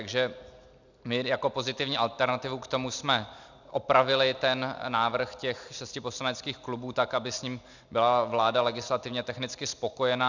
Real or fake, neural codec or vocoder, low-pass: real; none; 7.2 kHz